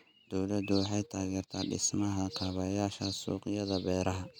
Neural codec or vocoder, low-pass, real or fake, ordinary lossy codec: none; 19.8 kHz; real; none